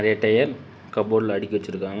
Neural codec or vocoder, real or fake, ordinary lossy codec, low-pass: none; real; none; none